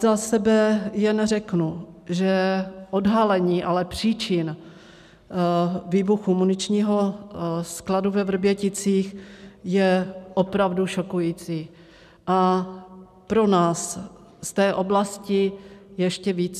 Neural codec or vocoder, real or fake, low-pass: none; real; 14.4 kHz